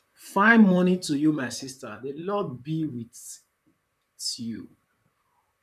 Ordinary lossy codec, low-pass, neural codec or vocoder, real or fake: none; 14.4 kHz; vocoder, 44.1 kHz, 128 mel bands, Pupu-Vocoder; fake